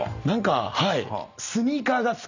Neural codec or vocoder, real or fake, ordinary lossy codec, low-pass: none; real; none; 7.2 kHz